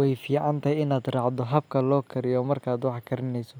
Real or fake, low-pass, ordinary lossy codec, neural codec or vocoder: real; none; none; none